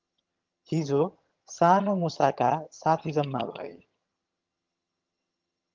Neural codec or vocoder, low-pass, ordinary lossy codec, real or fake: vocoder, 22.05 kHz, 80 mel bands, HiFi-GAN; 7.2 kHz; Opus, 32 kbps; fake